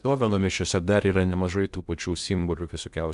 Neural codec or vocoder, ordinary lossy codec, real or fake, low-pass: codec, 16 kHz in and 24 kHz out, 0.8 kbps, FocalCodec, streaming, 65536 codes; Opus, 64 kbps; fake; 10.8 kHz